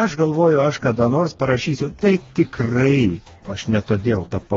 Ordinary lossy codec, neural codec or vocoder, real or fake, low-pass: AAC, 24 kbps; codec, 16 kHz, 2 kbps, FreqCodec, smaller model; fake; 7.2 kHz